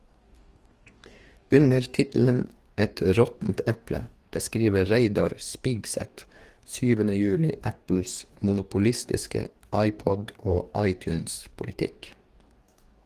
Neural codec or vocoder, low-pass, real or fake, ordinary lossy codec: codec, 44.1 kHz, 2.6 kbps, DAC; 14.4 kHz; fake; Opus, 24 kbps